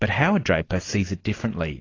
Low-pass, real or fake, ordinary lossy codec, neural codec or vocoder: 7.2 kHz; real; AAC, 32 kbps; none